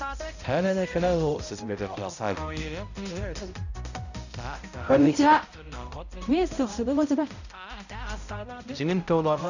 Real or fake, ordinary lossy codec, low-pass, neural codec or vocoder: fake; none; 7.2 kHz; codec, 16 kHz, 0.5 kbps, X-Codec, HuBERT features, trained on balanced general audio